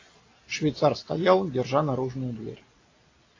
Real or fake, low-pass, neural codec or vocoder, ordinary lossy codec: real; 7.2 kHz; none; AAC, 32 kbps